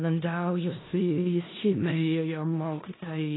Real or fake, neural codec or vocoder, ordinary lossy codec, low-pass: fake; codec, 16 kHz in and 24 kHz out, 0.4 kbps, LongCat-Audio-Codec, four codebook decoder; AAC, 16 kbps; 7.2 kHz